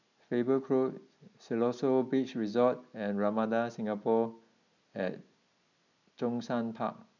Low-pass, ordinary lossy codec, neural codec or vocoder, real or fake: 7.2 kHz; none; none; real